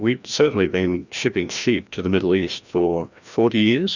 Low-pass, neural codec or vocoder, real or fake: 7.2 kHz; codec, 16 kHz, 1 kbps, FreqCodec, larger model; fake